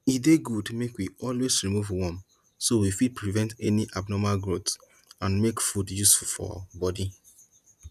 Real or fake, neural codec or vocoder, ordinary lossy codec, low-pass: real; none; none; 14.4 kHz